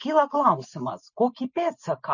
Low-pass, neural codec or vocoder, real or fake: 7.2 kHz; none; real